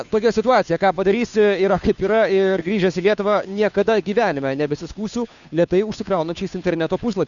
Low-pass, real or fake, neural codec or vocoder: 7.2 kHz; fake; codec, 16 kHz, 2 kbps, FunCodec, trained on Chinese and English, 25 frames a second